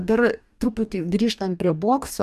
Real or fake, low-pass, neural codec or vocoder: fake; 14.4 kHz; codec, 44.1 kHz, 2.6 kbps, DAC